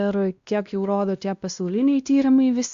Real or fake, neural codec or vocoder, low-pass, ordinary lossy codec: fake; codec, 16 kHz, 1 kbps, X-Codec, WavLM features, trained on Multilingual LibriSpeech; 7.2 kHz; Opus, 64 kbps